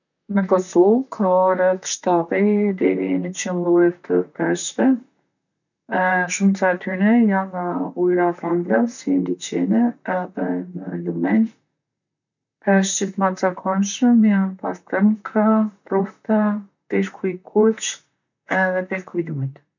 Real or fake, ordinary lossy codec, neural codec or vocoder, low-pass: fake; none; vocoder, 44.1 kHz, 128 mel bands, Pupu-Vocoder; 7.2 kHz